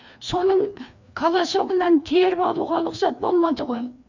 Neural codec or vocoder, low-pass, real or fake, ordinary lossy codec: codec, 16 kHz, 2 kbps, FreqCodec, larger model; 7.2 kHz; fake; none